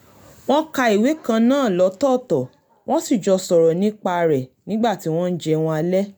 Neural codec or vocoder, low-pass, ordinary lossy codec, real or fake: none; none; none; real